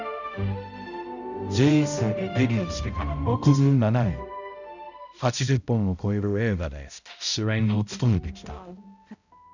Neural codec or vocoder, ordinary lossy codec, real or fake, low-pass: codec, 16 kHz, 0.5 kbps, X-Codec, HuBERT features, trained on balanced general audio; none; fake; 7.2 kHz